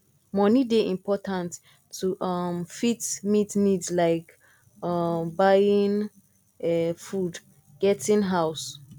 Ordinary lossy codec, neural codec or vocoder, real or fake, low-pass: none; none; real; 19.8 kHz